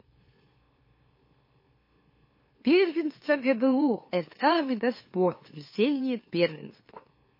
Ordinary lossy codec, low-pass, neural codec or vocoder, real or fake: MP3, 24 kbps; 5.4 kHz; autoencoder, 44.1 kHz, a latent of 192 numbers a frame, MeloTTS; fake